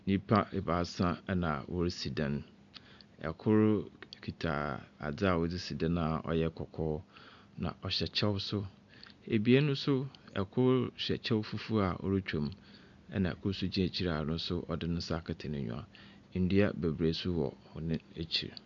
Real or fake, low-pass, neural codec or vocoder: real; 7.2 kHz; none